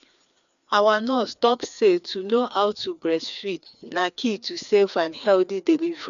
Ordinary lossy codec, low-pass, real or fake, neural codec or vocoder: none; 7.2 kHz; fake; codec, 16 kHz, 2 kbps, FreqCodec, larger model